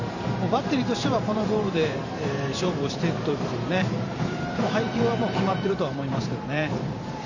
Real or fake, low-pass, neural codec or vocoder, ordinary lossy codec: fake; 7.2 kHz; vocoder, 44.1 kHz, 128 mel bands every 512 samples, BigVGAN v2; none